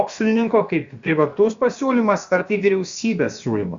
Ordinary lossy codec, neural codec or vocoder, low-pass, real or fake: Opus, 64 kbps; codec, 16 kHz, about 1 kbps, DyCAST, with the encoder's durations; 7.2 kHz; fake